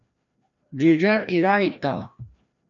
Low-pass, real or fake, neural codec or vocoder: 7.2 kHz; fake; codec, 16 kHz, 1 kbps, FreqCodec, larger model